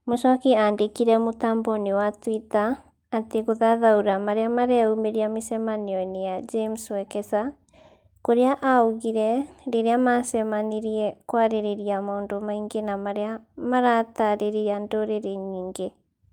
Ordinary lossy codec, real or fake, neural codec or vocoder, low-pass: Opus, 32 kbps; real; none; 19.8 kHz